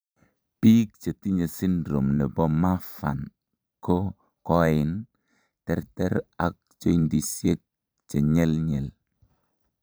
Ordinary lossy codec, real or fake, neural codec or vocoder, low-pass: none; fake; vocoder, 44.1 kHz, 128 mel bands every 512 samples, BigVGAN v2; none